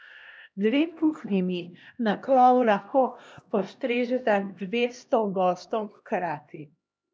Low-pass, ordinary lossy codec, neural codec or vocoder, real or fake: none; none; codec, 16 kHz, 1 kbps, X-Codec, HuBERT features, trained on LibriSpeech; fake